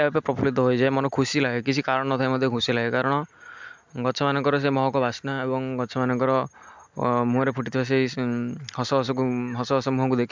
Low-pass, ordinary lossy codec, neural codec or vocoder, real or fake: 7.2 kHz; MP3, 64 kbps; none; real